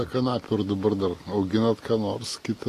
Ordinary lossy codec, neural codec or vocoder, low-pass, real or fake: AAC, 48 kbps; none; 14.4 kHz; real